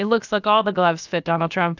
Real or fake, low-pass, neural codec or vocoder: fake; 7.2 kHz; codec, 16 kHz, about 1 kbps, DyCAST, with the encoder's durations